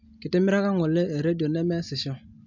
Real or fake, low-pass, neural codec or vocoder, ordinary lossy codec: real; 7.2 kHz; none; MP3, 64 kbps